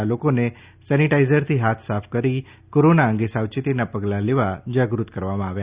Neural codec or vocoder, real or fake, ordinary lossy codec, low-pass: none; real; Opus, 64 kbps; 3.6 kHz